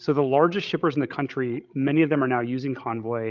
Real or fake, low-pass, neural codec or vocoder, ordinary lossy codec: fake; 7.2 kHz; codec, 16 kHz, 16 kbps, FreqCodec, larger model; Opus, 32 kbps